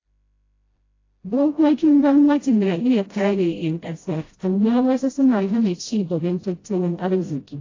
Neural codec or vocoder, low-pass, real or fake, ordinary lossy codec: codec, 16 kHz, 0.5 kbps, FreqCodec, smaller model; 7.2 kHz; fake; AAC, 32 kbps